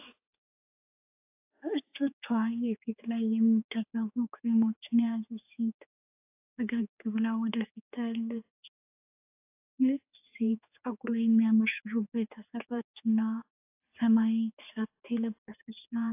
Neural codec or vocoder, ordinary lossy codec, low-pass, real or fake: codec, 16 kHz, 4 kbps, X-Codec, HuBERT features, trained on general audio; AAC, 32 kbps; 3.6 kHz; fake